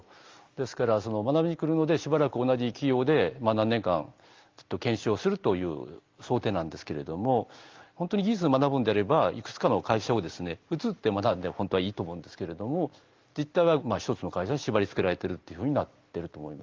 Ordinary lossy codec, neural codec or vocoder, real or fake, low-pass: Opus, 32 kbps; none; real; 7.2 kHz